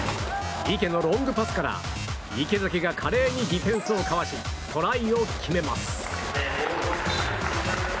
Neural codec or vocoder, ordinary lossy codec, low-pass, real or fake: none; none; none; real